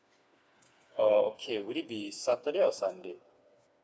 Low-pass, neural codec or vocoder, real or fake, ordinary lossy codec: none; codec, 16 kHz, 4 kbps, FreqCodec, smaller model; fake; none